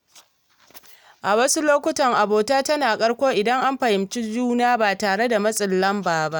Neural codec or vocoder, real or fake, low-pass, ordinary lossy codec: none; real; none; none